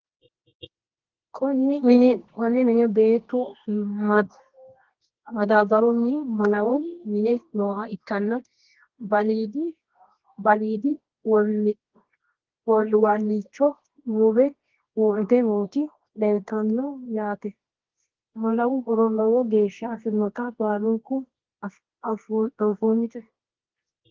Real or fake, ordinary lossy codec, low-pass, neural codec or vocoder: fake; Opus, 16 kbps; 7.2 kHz; codec, 24 kHz, 0.9 kbps, WavTokenizer, medium music audio release